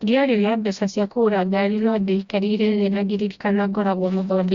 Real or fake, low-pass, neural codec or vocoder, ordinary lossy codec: fake; 7.2 kHz; codec, 16 kHz, 1 kbps, FreqCodec, smaller model; Opus, 64 kbps